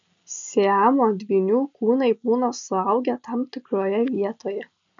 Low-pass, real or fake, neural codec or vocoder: 7.2 kHz; real; none